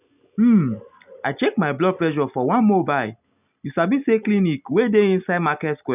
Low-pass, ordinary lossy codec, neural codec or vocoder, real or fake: 3.6 kHz; none; none; real